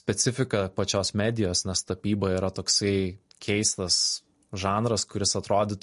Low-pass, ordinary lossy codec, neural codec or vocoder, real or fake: 14.4 kHz; MP3, 48 kbps; none; real